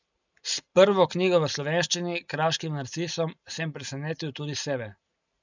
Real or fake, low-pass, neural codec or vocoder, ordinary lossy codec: real; 7.2 kHz; none; none